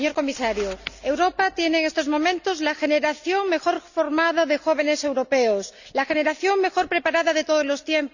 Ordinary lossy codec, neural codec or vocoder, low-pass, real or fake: none; none; 7.2 kHz; real